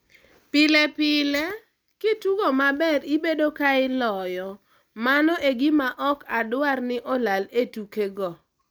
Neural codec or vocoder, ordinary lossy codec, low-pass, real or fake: none; none; none; real